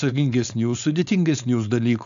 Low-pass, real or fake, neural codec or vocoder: 7.2 kHz; fake; codec, 16 kHz, 4.8 kbps, FACodec